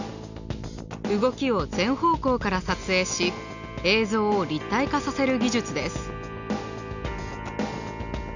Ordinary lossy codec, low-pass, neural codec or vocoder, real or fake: none; 7.2 kHz; none; real